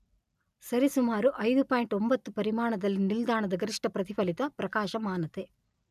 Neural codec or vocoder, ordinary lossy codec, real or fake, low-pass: none; none; real; 14.4 kHz